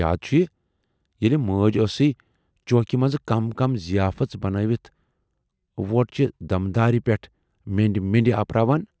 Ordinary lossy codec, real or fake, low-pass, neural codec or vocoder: none; real; none; none